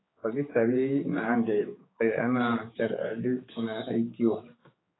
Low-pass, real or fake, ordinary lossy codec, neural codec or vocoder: 7.2 kHz; fake; AAC, 16 kbps; codec, 16 kHz, 4 kbps, X-Codec, HuBERT features, trained on general audio